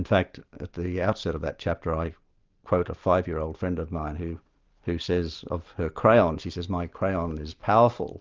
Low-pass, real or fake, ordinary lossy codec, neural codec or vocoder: 7.2 kHz; real; Opus, 24 kbps; none